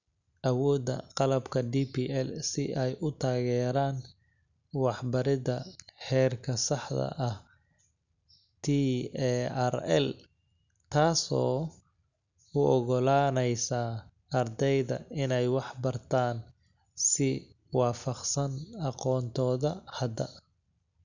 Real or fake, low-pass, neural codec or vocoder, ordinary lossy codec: real; 7.2 kHz; none; none